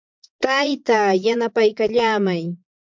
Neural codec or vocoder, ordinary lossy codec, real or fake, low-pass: vocoder, 22.05 kHz, 80 mel bands, Vocos; MP3, 64 kbps; fake; 7.2 kHz